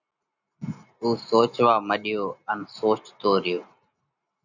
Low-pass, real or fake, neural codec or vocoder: 7.2 kHz; real; none